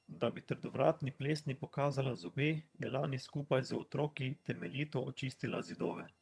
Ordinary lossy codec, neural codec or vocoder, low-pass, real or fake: none; vocoder, 22.05 kHz, 80 mel bands, HiFi-GAN; none; fake